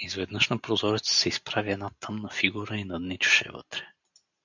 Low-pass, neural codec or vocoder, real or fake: 7.2 kHz; none; real